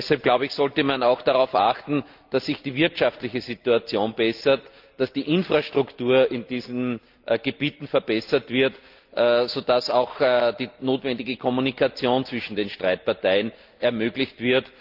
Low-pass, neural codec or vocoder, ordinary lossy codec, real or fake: 5.4 kHz; none; Opus, 32 kbps; real